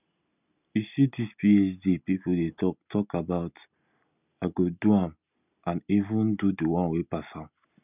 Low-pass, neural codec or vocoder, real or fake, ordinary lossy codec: 3.6 kHz; none; real; none